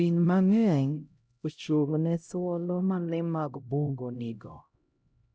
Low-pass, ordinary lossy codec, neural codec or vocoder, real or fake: none; none; codec, 16 kHz, 0.5 kbps, X-Codec, HuBERT features, trained on LibriSpeech; fake